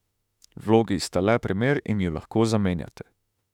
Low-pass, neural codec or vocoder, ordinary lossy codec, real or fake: 19.8 kHz; autoencoder, 48 kHz, 32 numbers a frame, DAC-VAE, trained on Japanese speech; none; fake